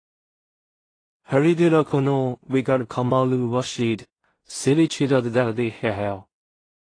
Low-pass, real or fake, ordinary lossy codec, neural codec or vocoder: 9.9 kHz; fake; AAC, 32 kbps; codec, 16 kHz in and 24 kHz out, 0.4 kbps, LongCat-Audio-Codec, two codebook decoder